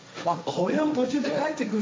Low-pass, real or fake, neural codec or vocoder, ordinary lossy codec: none; fake; codec, 16 kHz, 1.1 kbps, Voila-Tokenizer; none